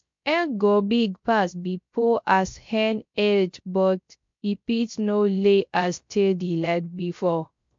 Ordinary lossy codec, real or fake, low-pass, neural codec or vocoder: MP3, 48 kbps; fake; 7.2 kHz; codec, 16 kHz, 0.3 kbps, FocalCodec